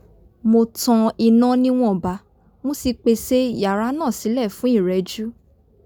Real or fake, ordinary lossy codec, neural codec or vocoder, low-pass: real; none; none; none